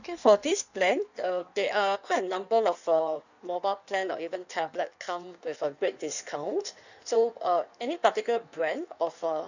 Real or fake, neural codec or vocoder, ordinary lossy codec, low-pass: fake; codec, 16 kHz in and 24 kHz out, 1.1 kbps, FireRedTTS-2 codec; none; 7.2 kHz